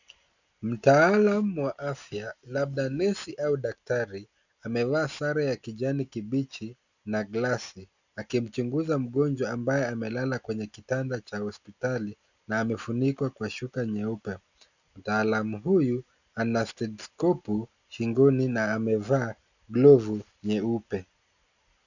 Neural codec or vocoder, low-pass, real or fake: none; 7.2 kHz; real